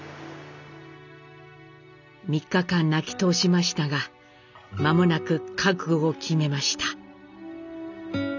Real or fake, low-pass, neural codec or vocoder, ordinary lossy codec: real; 7.2 kHz; none; none